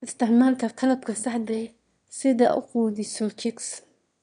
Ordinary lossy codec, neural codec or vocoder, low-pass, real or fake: none; autoencoder, 22.05 kHz, a latent of 192 numbers a frame, VITS, trained on one speaker; 9.9 kHz; fake